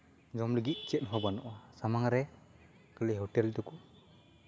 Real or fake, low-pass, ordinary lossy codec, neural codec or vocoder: real; none; none; none